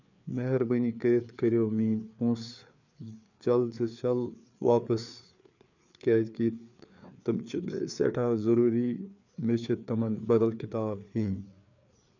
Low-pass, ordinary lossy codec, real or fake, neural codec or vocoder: 7.2 kHz; none; fake; codec, 16 kHz, 4 kbps, FreqCodec, larger model